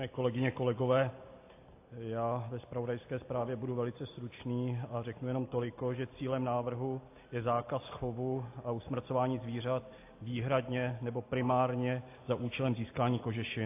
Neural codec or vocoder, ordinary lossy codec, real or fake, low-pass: none; AAC, 24 kbps; real; 3.6 kHz